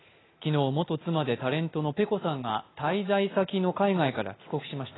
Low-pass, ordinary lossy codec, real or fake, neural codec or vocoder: 7.2 kHz; AAC, 16 kbps; real; none